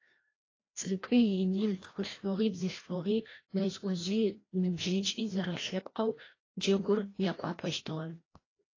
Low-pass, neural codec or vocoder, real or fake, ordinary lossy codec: 7.2 kHz; codec, 16 kHz, 1 kbps, FreqCodec, larger model; fake; AAC, 32 kbps